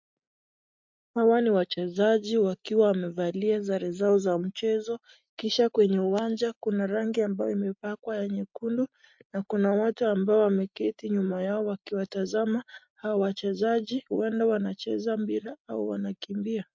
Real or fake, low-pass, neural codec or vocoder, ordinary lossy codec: real; 7.2 kHz; none; MP3, 48 kbps